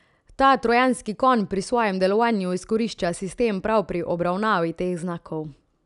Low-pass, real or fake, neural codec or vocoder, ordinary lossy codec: 10.8 kHz; real; none; none